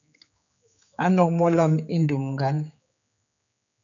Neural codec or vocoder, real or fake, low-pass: codec, 16 kHz, 4 kbps, X-Codec, HuBERT features, trained on general audio; fake; 7.2 kHz